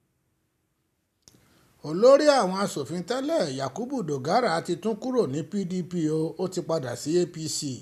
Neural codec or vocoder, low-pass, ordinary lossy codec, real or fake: none; 14.4 kHz; none; real